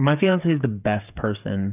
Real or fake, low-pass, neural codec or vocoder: fake; 3.6 kHz; codec, 16 kHz, 8 kbps, FreqCodec, smaller model